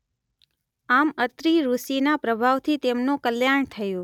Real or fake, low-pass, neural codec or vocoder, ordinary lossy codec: real; 19.8 kHz; none; none